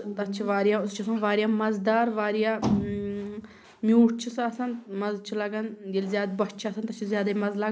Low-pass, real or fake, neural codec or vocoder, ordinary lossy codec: none; real; none; none